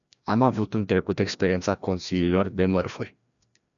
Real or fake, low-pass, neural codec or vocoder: fake; 7.2 kHz; codec, 16 kHz, 1 kbps, FreqCodec, larger model